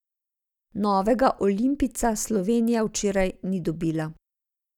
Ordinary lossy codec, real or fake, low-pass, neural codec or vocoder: none; real; 19.8 kHz; none